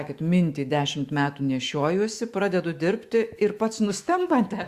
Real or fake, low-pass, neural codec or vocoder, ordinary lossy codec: fake; 14.4 kHz; autoencoder, 48 kHz, 128 numbers a frame, DAC-VAE, trained on Japanese speech; Opus, 64 kbps